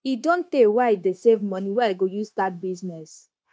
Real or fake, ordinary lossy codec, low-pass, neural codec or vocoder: fake; none; none; codec, 16 kHz, 0.9 kbps, LongCat-Audio-Codec